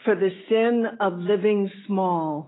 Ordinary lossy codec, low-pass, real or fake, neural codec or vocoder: AAC, 16 kbps; 7.2 kHz; fake; autoencoder, 48 kHz, 128 numbers a frame, DAC-VAE, trained on Japanese speech